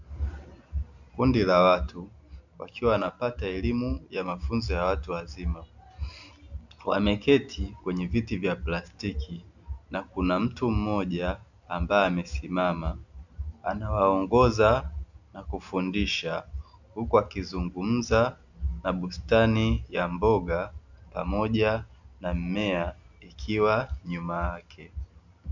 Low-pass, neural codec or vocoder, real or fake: 7.2 kHz; none; real